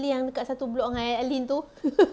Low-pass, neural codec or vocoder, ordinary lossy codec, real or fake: none; none; none; real